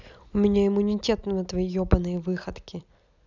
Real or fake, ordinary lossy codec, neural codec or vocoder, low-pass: real; none; none; 7.2 kHz